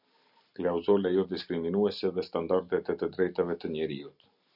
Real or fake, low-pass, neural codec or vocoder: real; 5.4 kHz; none